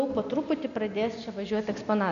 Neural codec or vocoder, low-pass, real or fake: none; 7.2 kHz; real